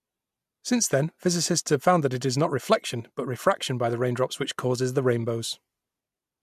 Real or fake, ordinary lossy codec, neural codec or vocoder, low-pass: real; MP3, 96 kbps; none; 14.4 kHz